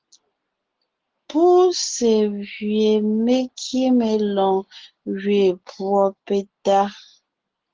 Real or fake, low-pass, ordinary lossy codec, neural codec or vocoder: real; 7.2 kHz; Opus, 16 kbps; none